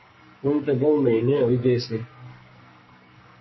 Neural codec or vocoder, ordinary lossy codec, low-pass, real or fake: codec, 44.1 kHz, 2.6 kbps, SNAC; MP3, 24 kbps; 7.2 kHz; fake